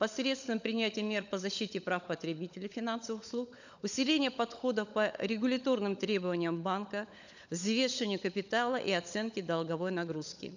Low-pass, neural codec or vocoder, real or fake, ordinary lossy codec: 7.2 kHz; codec, 16 kHz, 16 kbps, FunCodec, trained on LibriTTS, 50 frames a second; fake; none